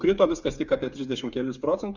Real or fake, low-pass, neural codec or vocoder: fake; 7.2 kHz; codec, 16 kHz, 8 kbps, FreqCodec, smaller model